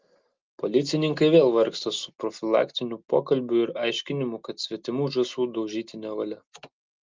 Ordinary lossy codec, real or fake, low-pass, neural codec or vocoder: Opus, 32 kbps; real; 7.2 kHz; none